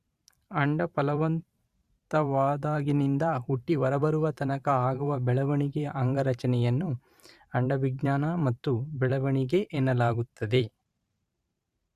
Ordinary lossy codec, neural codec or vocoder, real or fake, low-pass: Opus, 64 kbps; vocoder, 44.1 kHz, 128 mel bands every 512 samples, BigVGAN v2; fake; 14.4 kHz